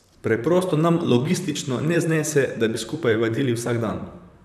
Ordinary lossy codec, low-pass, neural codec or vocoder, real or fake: none; 14.4 kHz; vocoder, 44.1 kHz, 128 mel bands, Pupu-Vocoder; fake